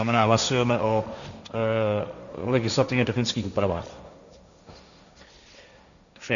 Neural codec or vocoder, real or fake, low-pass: codec, 16 kHz, 1.1 kbps, Voila-Tokenizer; fake; 7.2 kHz